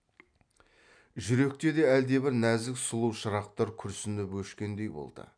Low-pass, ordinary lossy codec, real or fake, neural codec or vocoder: 9.9 kHz; none; real; none